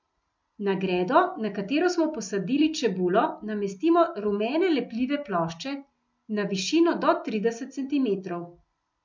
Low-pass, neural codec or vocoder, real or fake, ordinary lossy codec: 7.2 kHz; none; real; MP3, 64 kbps